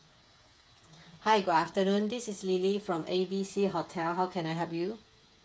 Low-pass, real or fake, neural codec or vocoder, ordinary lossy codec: none; fake; codec, 16 kHz, 8 kbps, FreqCodec, smaller model; none